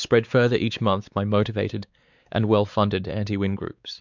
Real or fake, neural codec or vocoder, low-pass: fake; codec, 16 kHz, 4 kbps, X-Codec, WavLM features, trained on Multilingual LibriSpeech; 7.2 kHz